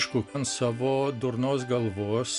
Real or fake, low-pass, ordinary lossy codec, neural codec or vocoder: real; 10.8 kHz; MP3, 96 kbps; none